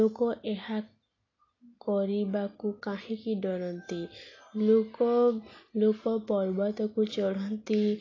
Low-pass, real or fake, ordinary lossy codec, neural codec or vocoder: 7.2 kHz; real; AAC, 32 kbps; none